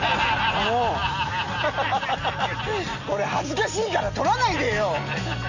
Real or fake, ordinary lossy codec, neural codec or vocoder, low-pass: real; none; none; 7.2 kHz